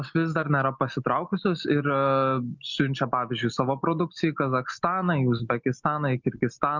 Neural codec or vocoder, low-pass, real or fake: none; 7.2 kHz; real